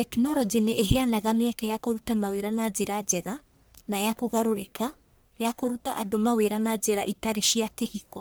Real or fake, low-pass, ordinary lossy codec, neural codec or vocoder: fake; none; none; codec, 44.1 kHz, 1.7 kbps, Pupu-Codec